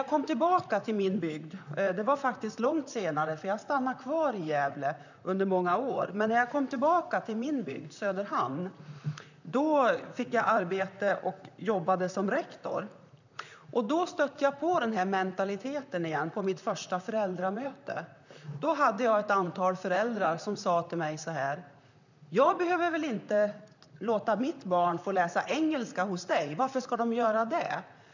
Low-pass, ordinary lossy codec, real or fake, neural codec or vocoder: 7.2 kHz; none; fake; vocoder, 44.1 kHz, 128 mel bands, Pupu-Vocoder